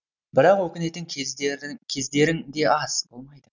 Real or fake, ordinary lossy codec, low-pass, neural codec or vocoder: real; none; 7.2 kHz; none